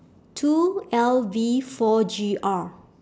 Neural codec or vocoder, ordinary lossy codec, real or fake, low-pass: none; none; real; none